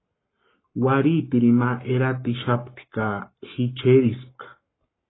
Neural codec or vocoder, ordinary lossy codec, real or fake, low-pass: codec, 44.1 kHz, 7.8 kbps, Pupu-Codec; AAC, 16 kbps; fake; 7.2 kHz